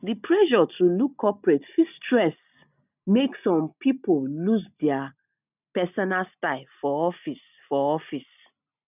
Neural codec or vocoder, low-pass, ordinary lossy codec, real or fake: none; 3.6 kHz; none; real